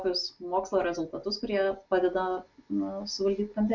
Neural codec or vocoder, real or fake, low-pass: none; real; 7.2 kHz